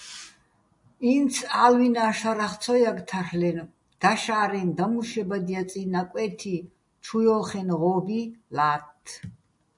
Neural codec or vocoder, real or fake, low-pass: none; real; 10.8 kHz